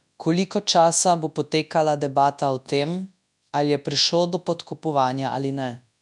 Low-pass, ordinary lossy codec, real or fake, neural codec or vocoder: 10.8 kHz; none; fake; codec, 24 kHz, 0.9 kbps, WavTokenizer, large speech release